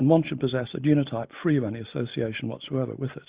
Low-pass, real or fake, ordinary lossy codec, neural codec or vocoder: 3.6 kHz; real; Opus, 64 kbps; none